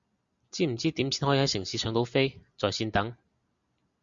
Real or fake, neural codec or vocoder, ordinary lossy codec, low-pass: real; none; Opus, 64 kbps; 7.2 kHz